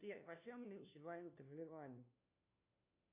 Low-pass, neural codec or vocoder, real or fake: 3.6 kHz; codec, 16 kHz, 1 kbps, FunCodec, trained on LibriTTS, 50 frames a second; fake